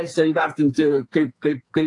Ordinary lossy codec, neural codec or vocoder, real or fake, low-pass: AAC, 48 kbps; codec, 44.1 kHz, 2.6 kbps, SNAC; fake; 10.8 kHz